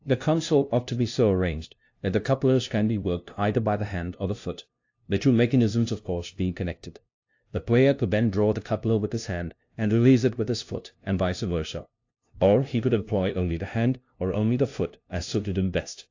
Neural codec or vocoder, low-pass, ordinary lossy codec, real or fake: codec, 16 kHz, 0.5 kbps, FunCodec, trained on LibriTTS, 25 frames a second; 7.2 kHz; AAC, 48 kbps; fake